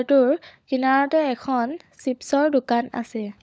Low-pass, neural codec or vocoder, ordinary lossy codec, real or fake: none; codec, 16 kHz, 16 kbps, FunCodec, trained on LibriTTS, 50 frames a second; none; fake